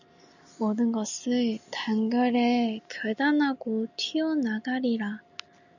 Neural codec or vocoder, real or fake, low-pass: none; real; 7.2 kHz